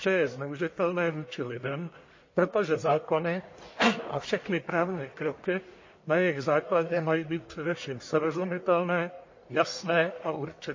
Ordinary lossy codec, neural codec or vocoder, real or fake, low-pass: MP3, 32 kbps; codec, 44.1 kHz, 1.7 kbps, Pupu-Codec; fake; 7.2 kHz